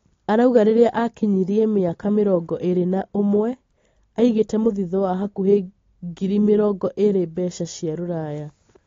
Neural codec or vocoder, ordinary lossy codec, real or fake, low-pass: none; AAC, 32 kbps; real; 7.2 kHz